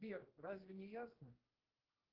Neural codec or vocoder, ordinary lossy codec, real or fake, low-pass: codec, 16 kHz, 2 kbps, X-Codec, HuBERT features, trained on general audio; Opus, 16 kbps; fake; 5.4 kHz